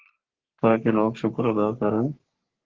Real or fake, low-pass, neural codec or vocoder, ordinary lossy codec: fake; 7.2 kHz; codec, 44.1 kHz, 3.4 kbps, Pupu-Codec; Opus, 16 kbps